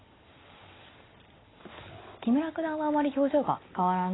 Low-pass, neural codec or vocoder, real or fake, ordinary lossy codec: 7.2 kHz; none; real; AAC, 16 kbps